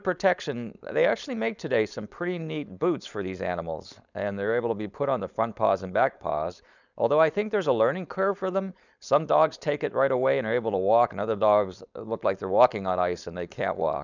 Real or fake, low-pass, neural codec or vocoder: fake; 7.2 kHz; codec, 16 kHz, 4.8 kbps, FACodec